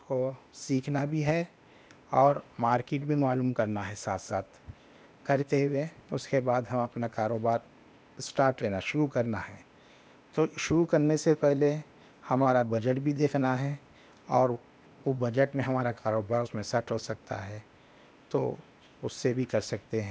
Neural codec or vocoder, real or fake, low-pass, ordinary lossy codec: codec, 16 kHz, 0.8 kbps, ZipCodec; fake; none; none